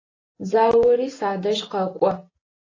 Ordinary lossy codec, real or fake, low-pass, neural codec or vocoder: AAC, 32 kbps; real; 7.2 kHz; none